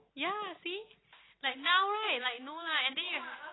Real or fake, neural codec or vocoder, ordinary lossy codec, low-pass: real; none; AAC, 16 kbps; 7.2 kHz